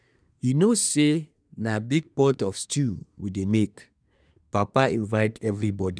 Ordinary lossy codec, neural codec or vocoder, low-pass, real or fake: none; codec, 24 kHz, 1 kbps, SNAC; 9.9 kHz; fake